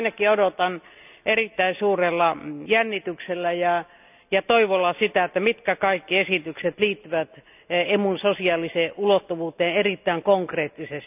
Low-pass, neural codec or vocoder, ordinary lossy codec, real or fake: 3.6 kHz; none; none; real